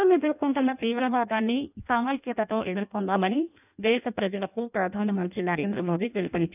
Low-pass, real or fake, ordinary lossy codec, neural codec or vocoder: 3.6 kHz; fake; none; codec, 16 kHz in and 24 kHz out, 0.6 kbps, FireRedTTS-2 codec